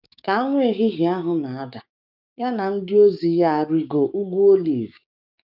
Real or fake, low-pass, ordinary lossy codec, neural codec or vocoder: fake; 5.4 kHz; none; codec, 16 kHz, 6 kbps, DAC